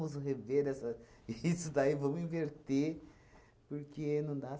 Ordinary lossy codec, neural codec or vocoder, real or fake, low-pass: none; none; real; none